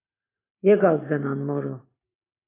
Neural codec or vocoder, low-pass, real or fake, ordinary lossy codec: none; 3.6 kHz; real; AAC, 16 kbps